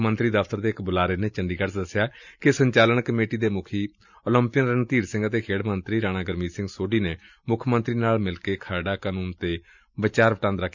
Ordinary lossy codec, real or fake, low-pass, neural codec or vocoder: none; real; 7.2 kHz; none